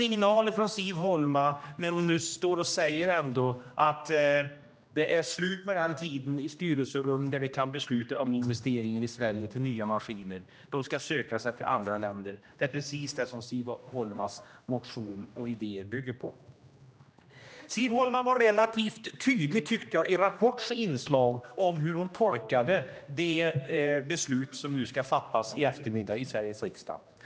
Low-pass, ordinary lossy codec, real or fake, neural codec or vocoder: none; none; fake; codec, 16 kHz, 1 kbps, X-Codec, HuBERT features, trained on general audio